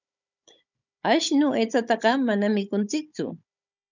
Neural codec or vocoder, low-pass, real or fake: codec, 16 kHz, 16 kbps, FunCodec, trained on Chinese and English, 50 frames a second; 7.2 kHz; fake